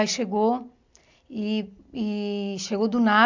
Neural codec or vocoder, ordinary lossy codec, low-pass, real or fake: none; MP3, 64 kbps; 7.2 kHz; real